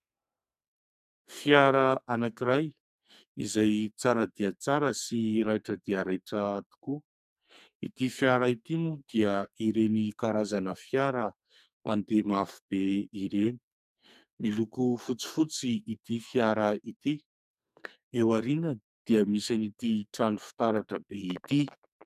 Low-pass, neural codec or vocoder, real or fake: 14.4 kHz; codec, 44.1 kHz, 2.6 kbps, SNAC; fake